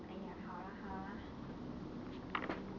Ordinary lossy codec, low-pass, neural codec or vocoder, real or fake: none; 7.2 kHz; none; real